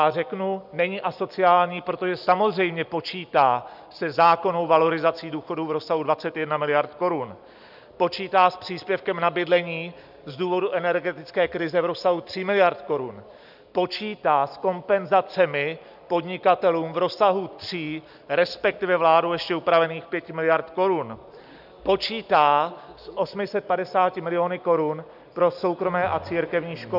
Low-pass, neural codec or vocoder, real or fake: 5.4 kHz; none; real